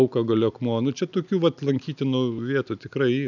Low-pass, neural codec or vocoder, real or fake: 7.2 kHz; none; real